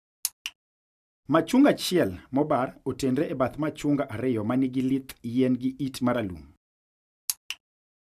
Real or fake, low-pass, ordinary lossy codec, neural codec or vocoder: real; 14.4 kHz; none; none